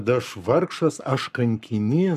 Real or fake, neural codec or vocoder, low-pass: fake; codec, 44.1 kHz, 7.8 kbps, Pupu-Codec; 14.4 kHz